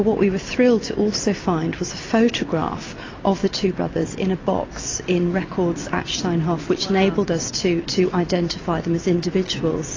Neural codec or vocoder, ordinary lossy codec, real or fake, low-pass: none; AAC, 32 kbps; real; 7.2 kHz